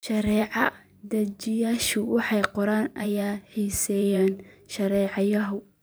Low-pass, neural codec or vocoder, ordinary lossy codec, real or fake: none; vocoder, 44.1 kHz, 128 mel bands every 512 samples, BigVGAN v2; none; fake